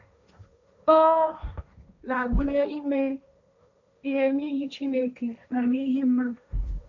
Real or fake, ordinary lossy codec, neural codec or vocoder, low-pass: fake; none; codec, 16 kHz, 1.1 kbps, Voila-Tokenizer; 7.2 kHz